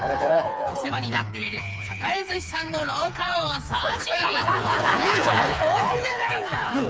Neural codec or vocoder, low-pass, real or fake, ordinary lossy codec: codec, 16 kHz, 4 kbps, FreqCodec, smaller model; none; fake; none